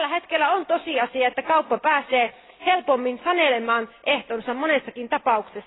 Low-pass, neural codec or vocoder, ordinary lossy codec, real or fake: 7.2 kHz; none; AAC, 16 kbps; real